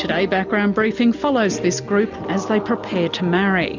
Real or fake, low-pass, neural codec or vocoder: real; 7.2 kHz; none